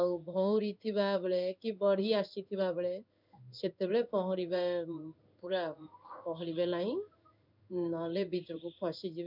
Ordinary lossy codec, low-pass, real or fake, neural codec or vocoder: none; 5.4 kHz; fake; codec, 16 kHz in and 24 kHz out, 1 kbps, XY-Tokenizer